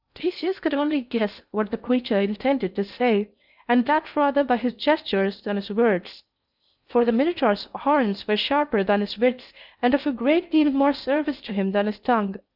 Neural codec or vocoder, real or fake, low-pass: codec, 16 kHz in and 24 kHz out, 0.6 kbps, FocalCodec, streaming, 2048 codes; fake; 5.4 kHz